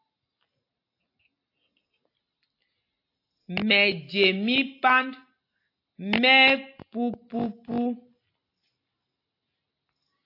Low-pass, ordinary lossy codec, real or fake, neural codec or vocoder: 5.4 kHz; AAC, 32 kbps; real; none